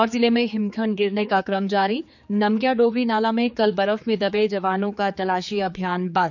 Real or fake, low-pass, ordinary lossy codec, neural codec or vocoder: fake; 7.2 kHz; none; codec, 16 kHz, 4 kbps, X-Codec, HuBERT features, trained on general audio